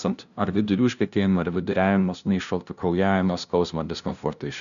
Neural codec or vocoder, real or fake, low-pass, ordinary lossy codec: codec, 16 kHz, 0.5 kbps, FunCodec, trained on LibriTTS, 25 frames a second; fake; 7.2 kHz; MP3, 96 kbps